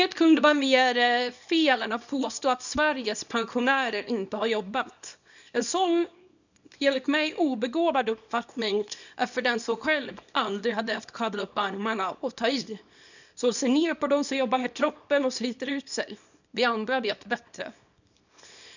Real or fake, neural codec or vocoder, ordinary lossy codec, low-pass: fake; codec, 24 kHz, 0.9 kbps, WavTokenizer, small release; none; 7.2 kHz